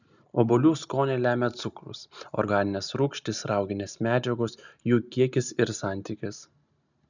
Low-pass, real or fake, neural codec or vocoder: 7.2 kHz; real; none